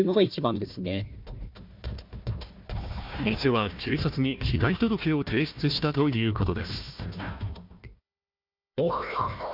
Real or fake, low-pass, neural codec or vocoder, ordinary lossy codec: fake; 5.4 kHz; codec, 16 kHz, 1 kbps, FunCodec, trained on Chinese and English, 50 frames a second; MP3, 48 kbps